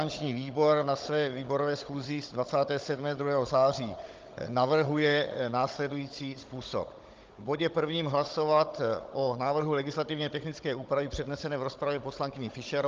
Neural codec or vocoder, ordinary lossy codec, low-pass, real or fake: codec, 16 kHz, 16 kbps, FunCodec, trained on Chinese and English, 50 frames a second; Opus, 24 kbps; 7.2 kHz; fake